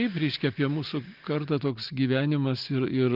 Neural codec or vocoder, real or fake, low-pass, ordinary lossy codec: none; real; 5.4 kHz; Opus, 32 kbps